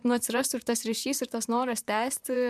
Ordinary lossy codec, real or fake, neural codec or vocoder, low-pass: MP3, 96 kbps; fake; vocoder, 44.1 kHz, 128 mel bands, Pupu-Vocoder; 14.4 kHz